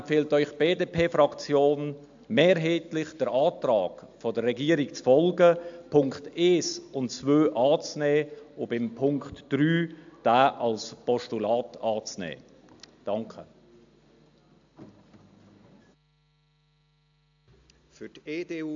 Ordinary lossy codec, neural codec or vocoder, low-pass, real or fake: AAC, 64 kbps; none; 7.2 kHz; real